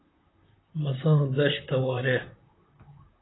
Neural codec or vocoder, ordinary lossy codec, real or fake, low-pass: vocoder, 44.1 kHz, 128 mel bands, Pupu-Vocoder; AAC, 16 kbps; fake; 7.2 kHz